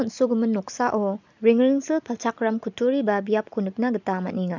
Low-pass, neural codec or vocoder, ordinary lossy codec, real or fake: 7.2 kHz; none; none; real